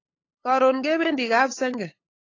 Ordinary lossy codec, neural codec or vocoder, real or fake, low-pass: AAC, 32 kbps; codec, 16 kHz, 8 kbps, FunCodec, trained on LibriTTS, 25 frames a second; fake; 7.2 kHz